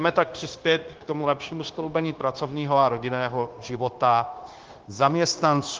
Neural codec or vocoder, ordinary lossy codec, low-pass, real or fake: codec, 16 kHz, 0.9 kbps, LongCat-Audio-Codec; Opus, 16 kbps; 7.2 kHz; fake